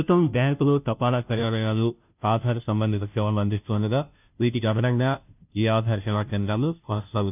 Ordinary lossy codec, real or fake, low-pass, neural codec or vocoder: none; fake; 3.6 kHz; codec, 16 kHz, 0.5 kbps, FunCodec, trained on Chinese and English, 25 frames a second